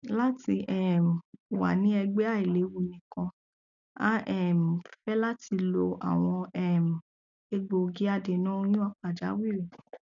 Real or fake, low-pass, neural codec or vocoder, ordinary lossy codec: real; 7.2 kHz; none; none